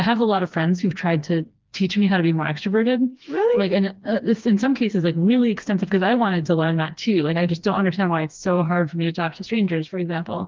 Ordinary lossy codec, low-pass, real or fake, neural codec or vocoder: Opus, 32 kbps; 7.2 kHz; fake; codec, 16 kHz, 2 kbps, FreqCodec, smaller model